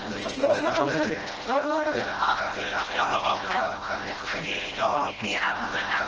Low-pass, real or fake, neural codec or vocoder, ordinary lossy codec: 7.2 kHz; fake; codec, 16 kHz, 0.5 kbps, FreqCodec, smaller model; Opus, 16 kbps